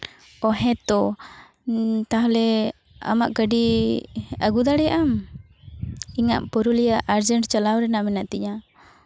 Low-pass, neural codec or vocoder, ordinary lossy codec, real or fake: none; none; none; real